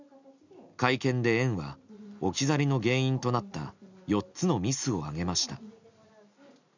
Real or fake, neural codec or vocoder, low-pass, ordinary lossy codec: real; none; 7.2 kHz; none